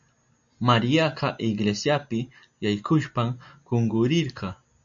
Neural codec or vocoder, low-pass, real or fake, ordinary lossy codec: none; 7.2 kHz; real; MP3, 64 kbps